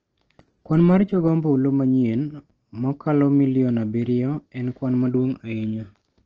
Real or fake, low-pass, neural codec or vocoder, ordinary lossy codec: real; 7.2 kHz; none; Opus, 16 kbps